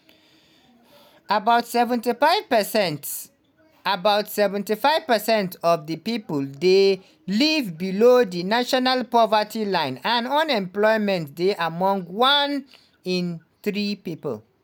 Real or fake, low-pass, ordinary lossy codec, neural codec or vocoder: real; none; none; none